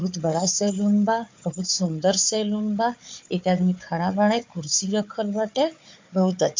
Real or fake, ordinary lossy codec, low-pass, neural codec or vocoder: fake; MP3, 48 kbps; 7.2 kHz; codec, 16 kHz, 4 kbps, FunCodec, trained on Chinese and English, 50 frames a second